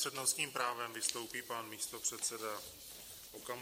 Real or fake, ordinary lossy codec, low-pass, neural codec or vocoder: fake; MP3, 64 kbps; 19.8 kHz; vocoder, 44.1 kHz, 128 mel bands every 512 samples, BigVGAN v2